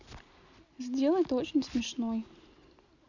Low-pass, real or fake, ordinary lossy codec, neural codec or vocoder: 7.2 kHz; real; none; none